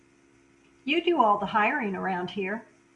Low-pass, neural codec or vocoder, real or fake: 10.8 kHz; none; real